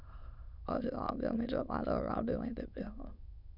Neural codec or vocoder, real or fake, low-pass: autoencoder, 22.05 kHz, a latent of 192 numbers a frame, VITS, trained on many speakers; fake; 5.4 kHz